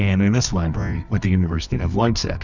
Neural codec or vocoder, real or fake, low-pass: codec, 24 kHz, 0.9 kbps, WavTokenizer, medium music audio release; fake; 7.2 kHz